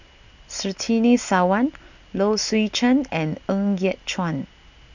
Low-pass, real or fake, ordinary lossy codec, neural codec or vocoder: 7.2 kHz; real; none; none